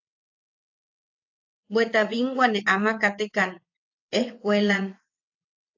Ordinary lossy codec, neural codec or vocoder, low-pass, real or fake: AAC, 48 kbps; vocoder, 22.05 kHz, 80 mel bands, WaveNeXt; 7.2 kHz; fake